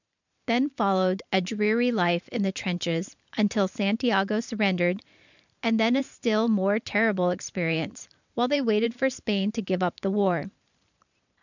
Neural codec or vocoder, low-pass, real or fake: vocoder, 44.1 kHz, 128 mel bands every 512 samples, BigVGAN v2; 7.2 kHz; fake